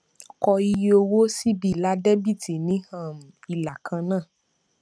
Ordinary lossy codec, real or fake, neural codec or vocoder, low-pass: none; real; none; none